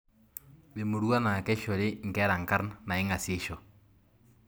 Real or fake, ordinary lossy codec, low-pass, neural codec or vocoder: real; none; none; none